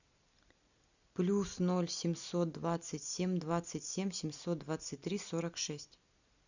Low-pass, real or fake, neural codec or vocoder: 7.2 kHz; real; none